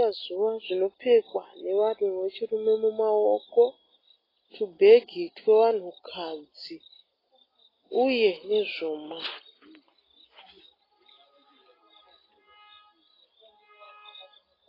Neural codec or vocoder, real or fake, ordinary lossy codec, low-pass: none; real; AAC, 24 kbps; 5.4 kHz